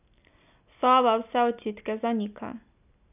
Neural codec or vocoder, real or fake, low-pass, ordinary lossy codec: none; real; 3.6 kHz; none